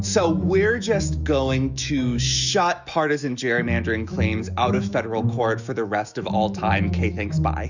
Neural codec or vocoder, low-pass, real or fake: autoencoder, 48 kHz, 128 numbers a frame, DAC-VAE, trained on Japanese speech; 7.2 kHz; fake